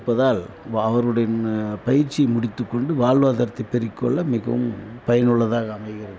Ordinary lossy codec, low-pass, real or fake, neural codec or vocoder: none; none; real; none